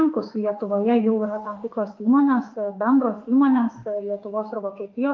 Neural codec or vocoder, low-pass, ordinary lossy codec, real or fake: autoencoder, 48 kHz, 32 numbers a frame, DAC-VAE, trained on Japanese speech; 7.2 kHz; Opus, 24 kbps; fake